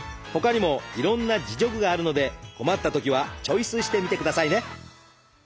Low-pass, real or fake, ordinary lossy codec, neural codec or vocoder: none; real; none; none